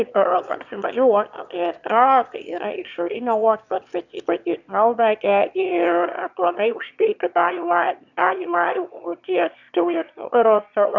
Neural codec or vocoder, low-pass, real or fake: autoencoder, 22.05 kHz, a latent of 192 numbers a frame, VITS, trained on one speaker; 7.2 kHz; fake